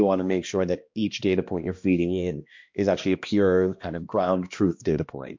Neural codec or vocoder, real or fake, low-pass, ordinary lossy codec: codec, 16 kHz, 1 kbps, X-Codec, HuBERT features, trained on balanced general audio; fake; 7.2 kHz; MP3, 48 kbps